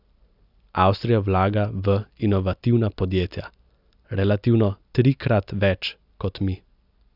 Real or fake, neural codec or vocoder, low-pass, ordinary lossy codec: real; none; 5.4 kHz; none